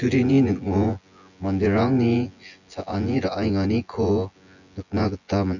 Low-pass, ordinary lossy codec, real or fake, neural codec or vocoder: 7.2 kHz; none; fake; vocoder, 24 kHz, 100 mel bands, Vocos